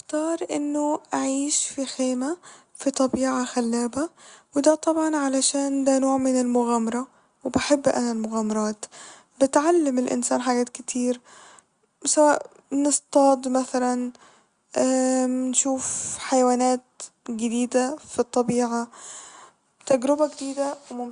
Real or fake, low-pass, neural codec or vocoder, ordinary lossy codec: real; 9.9 kHz; none; Opus, 64 kbps